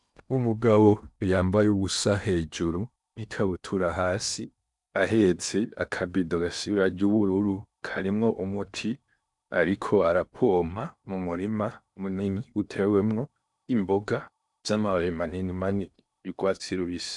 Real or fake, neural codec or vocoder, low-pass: fake; codec, 16 kHz in and 24 kHz out, 0.8 kbps, FocalCodec, streaming, 65536 codes; 10.8 kHz